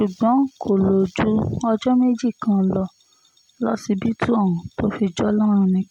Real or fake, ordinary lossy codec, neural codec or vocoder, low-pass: real; MP3, 96 kbps; none; 19.8 kHz